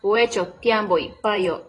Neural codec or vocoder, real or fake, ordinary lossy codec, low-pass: vocoder, 44.1 kHz, 128 mel bands every 512 samples, BigVGAN v2; fake; AAC, 32 kbps; 10.8 kHz